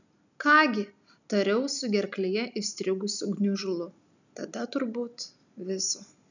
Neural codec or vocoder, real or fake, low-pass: none; real; 7.2 kHz